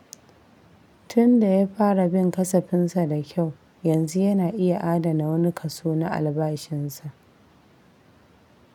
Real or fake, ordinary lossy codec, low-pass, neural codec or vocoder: real; none; 19.8 kHz; none